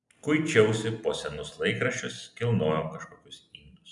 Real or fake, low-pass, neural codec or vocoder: real; 10.8 kHz; none